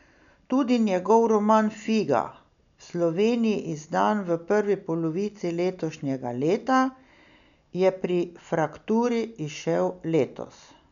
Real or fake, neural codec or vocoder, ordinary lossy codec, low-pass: real; none; none; 7.2 kHz